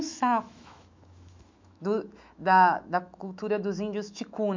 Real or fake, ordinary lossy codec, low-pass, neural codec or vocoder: fake; MP3, 64 kbps; 7.2 kHz; autoencoder, 48 kHz, 128 numbers a frame, DAC-VAE, trained on Japanese speech